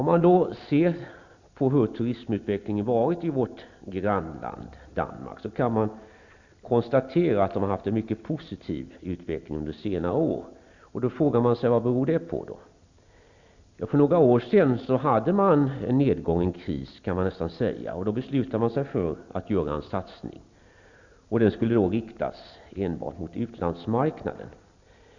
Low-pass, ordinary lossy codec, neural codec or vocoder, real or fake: 7.2 kHz; Opus, 64 kbps; none; real